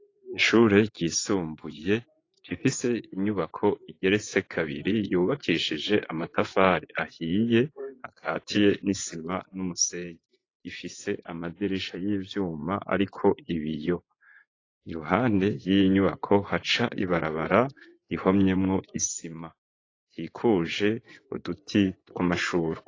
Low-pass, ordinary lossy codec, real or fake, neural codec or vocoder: 7.2 kHz; AAC, 32 kbps; fake; vocoder, 24 kHz, 100 mel bands, Vocos